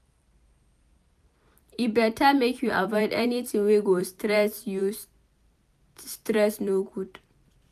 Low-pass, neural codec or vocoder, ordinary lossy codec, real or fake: 14.4 kHz; vocoder, 44.1 kHz, 128 mel bands every 256 samples, BigVGAN v2; none; fake